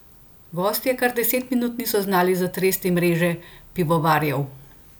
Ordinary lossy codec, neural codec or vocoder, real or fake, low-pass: none; none; real; none